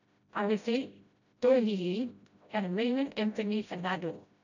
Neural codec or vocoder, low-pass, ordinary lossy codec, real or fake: codec, 16 kHz, 0.5 kbps, FreqCodec, smaller model; 7.2 kHz; none; fake